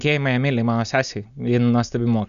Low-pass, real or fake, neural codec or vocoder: 7.2 kHz; real; none